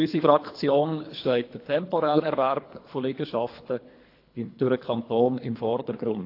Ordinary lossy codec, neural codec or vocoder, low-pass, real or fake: AAC, 32 kbps; codec, 24 kHz, 3 kbps, HILCodec; 5.4 kHz; fake